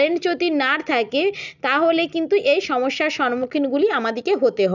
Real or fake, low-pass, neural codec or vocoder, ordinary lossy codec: real; 7.2 kHz; none; none